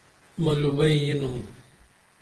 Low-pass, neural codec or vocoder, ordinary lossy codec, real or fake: 10.8 kHz; vocoder, 48 kHz, 128 mel bands, Vocos; Opus, 16 kbps; fake